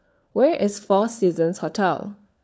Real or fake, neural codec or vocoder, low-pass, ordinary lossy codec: fake; codec, 16 kHz, 4 kbps, FunCodec, trained on LibriTTS, 50 frames a second; none; none